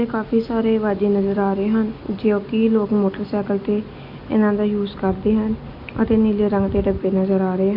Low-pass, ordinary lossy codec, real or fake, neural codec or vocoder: 5.4 kHz; AAC, 48 kbps; real; none